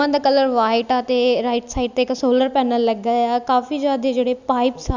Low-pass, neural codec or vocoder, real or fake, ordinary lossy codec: 7.2 kHz; none; real; none